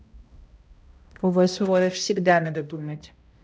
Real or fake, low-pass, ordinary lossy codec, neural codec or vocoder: fake; none; none; codec, 16 kHz, 0.5 kbps, X-Codec, HuBERT features, trained on balanced general audio